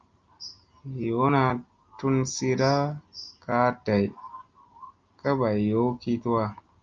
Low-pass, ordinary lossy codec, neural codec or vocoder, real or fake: 7.2 kHz; Opus, 24 kbps; none; real